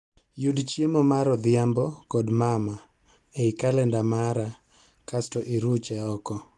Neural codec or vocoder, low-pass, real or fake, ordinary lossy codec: none; 10.8 kHz; real; Opus, 24 kbps